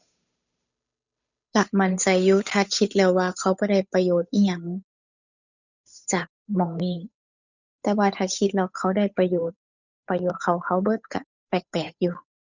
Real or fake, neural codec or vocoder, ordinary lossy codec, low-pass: fake; codec, 16 kHz, 8 kbps, FunCodec, trained on Chinese and English, 25 frames a second; none; 7.2 kHz